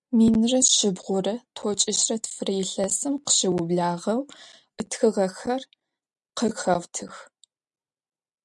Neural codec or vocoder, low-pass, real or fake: none; 10.8 kHz; real